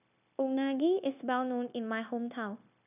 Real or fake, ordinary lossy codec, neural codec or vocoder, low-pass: fake; none; codec, 16 kHz, 0.9 kbps, LongCat-Audio-Codec; 3.6 kHz